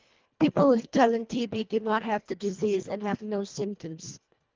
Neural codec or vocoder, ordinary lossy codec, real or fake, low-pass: codec, 24 kHz, 1.5 kbps, HILCodec; Opus, 32 kbps; fake; 7.2 kHz